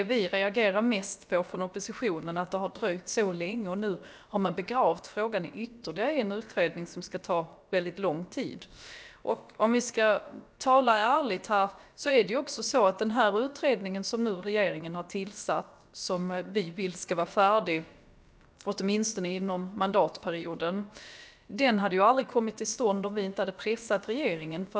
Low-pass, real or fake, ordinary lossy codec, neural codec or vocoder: none; fake; none; codec, 16 kHz, about 1 kbps, DyCAST, with the encoder's durations